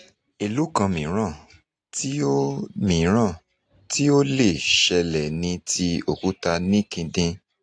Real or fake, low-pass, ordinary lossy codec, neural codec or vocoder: real; 9.9 kHz; AAC, 48 kbps; none